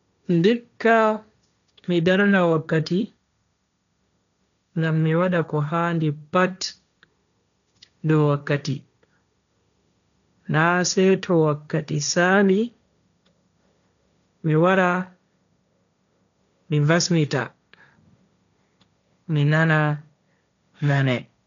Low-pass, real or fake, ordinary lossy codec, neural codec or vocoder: 7.2 kHz; fake; none; codec, 16 kHz, 1.1 kbps, Voila-Tokenizer